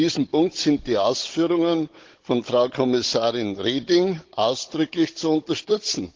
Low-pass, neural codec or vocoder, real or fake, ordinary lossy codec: 7.2 kHz; codec, 16 kHz, 16 kbps, FunCodec, trained on Chinese and English, 50 frames a second; fake; Opus, 16 kbps